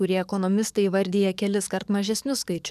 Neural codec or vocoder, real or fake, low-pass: codec, 44.1 kHz, 7.8 kbps, DAC; fake; 14.4 kHz